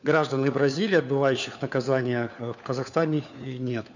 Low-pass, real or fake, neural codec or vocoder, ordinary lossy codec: 7.2 kHz; fake; codec, 16 kHz, 4 kbps, FunCodec, trained on LibriTTS, 50 frames a second; none